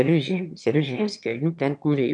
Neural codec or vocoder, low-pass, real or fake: autoencoder, 22.05 kHz, a latent of 192 numbers a frame, VITS, trained on one speaker; 9.9 kHz; fake